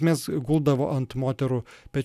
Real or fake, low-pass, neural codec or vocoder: real; 14.4 kHz; none